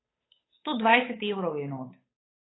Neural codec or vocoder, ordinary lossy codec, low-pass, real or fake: codec, 16 kHz, 8 kbps, FunCodec, trained on Chinese and English, 25 frames a second; AAC, 16 kbps; 7.2 kHz; fake